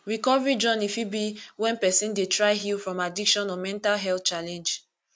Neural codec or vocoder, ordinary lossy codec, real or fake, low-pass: none; none; real; none